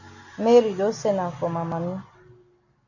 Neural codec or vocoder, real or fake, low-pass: none; real; 7.2 kHz